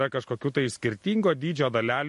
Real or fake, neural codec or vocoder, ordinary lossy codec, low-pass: real; none; MP3, 48 kbps; 14.4 kHz